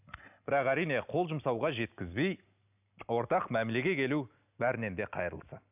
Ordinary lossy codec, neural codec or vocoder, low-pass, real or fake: none; none; 3.6 kHz; real